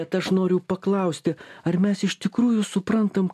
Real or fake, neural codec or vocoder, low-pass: real; none; 14.4 kHz